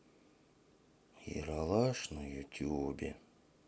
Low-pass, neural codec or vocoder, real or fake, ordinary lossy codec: none; none; real; none